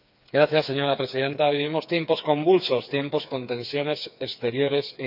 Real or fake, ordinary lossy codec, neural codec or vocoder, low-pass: fake; none; codec, 16 kHz, 4 kbps, FreqCodec, smaller model; 5.4 kHz